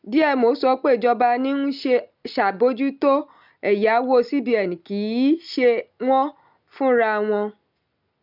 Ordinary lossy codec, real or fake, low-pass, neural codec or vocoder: none; real; 5.4 kHz; none